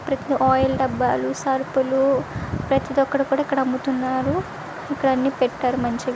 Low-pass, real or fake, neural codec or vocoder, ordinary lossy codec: none; real; none; none